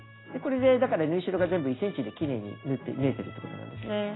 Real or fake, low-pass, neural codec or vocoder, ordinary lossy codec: real; 7.2 kHz; none; AAC, 16 kbps